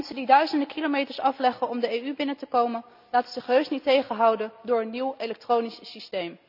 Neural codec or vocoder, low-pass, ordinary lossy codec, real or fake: none; 5.4 kHz; none; real